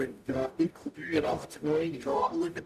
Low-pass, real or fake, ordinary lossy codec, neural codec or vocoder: 14.4 kHz; fake; none; codec, 44.1 kHz, 0.9 kbps, DAC